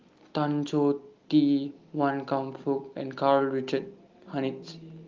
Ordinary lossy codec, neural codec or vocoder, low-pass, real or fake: Opus, 32 kbps; none; 7.2 kHz; real